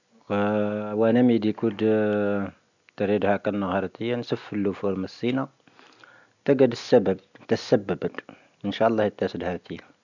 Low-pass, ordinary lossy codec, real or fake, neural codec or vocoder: 7.2 kHz; none; real; none